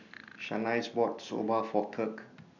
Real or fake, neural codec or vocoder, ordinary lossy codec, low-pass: real; none; none; 7.2 kHz